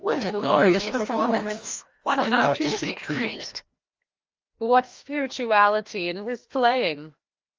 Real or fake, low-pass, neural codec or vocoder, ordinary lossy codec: fake; 7.2 kHz; codec, 16 kHz, 1 kbps, FreqCodec, larger model; Opus, 32 kbps